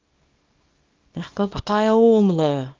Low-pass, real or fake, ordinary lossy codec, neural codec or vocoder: 7.2 kHz; fake; Opus, 24 kbps; codec, 24 kHz, 0.9 kbps, WavTokenizer, small release